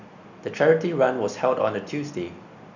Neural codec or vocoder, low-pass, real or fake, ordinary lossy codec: none; 7.2 kHz; real; none